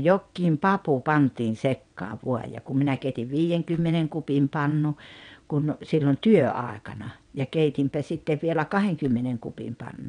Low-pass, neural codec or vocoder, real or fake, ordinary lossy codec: 9.9 kHz; vocoder, 22.05 kHz, 80 mel bands, WaveNeXt; fake; none